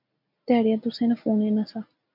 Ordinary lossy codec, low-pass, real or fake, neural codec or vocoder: MP3, 48 kbps; 5.4 kHz; real; none